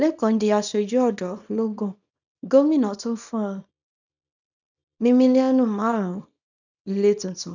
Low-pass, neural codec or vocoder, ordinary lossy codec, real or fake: 7.2 kHz; codec, 24 kHz, 0.9 kbps, WavTokenizer, small release; none; fake